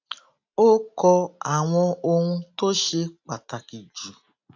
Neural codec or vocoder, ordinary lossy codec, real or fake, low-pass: none; AAC, 48 kbps; real; 7.2 kHz